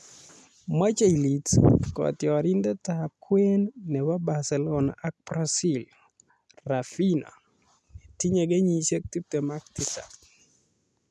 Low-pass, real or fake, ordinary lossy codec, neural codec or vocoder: none; real; none; none